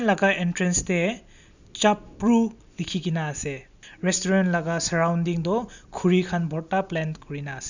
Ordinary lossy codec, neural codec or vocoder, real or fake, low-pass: none; none; real; 7.2 kHz